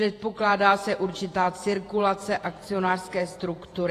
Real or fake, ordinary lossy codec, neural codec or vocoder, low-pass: fake; AAC, 48 kbps; vocoder, 48 kHz, 128 mel bands, Vocos; 14.4 kHz